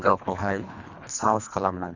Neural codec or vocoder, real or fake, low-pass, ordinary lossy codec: codec, 24 kHz, 1.5 kbps, HILCodec; fake; 7.2 kHz; none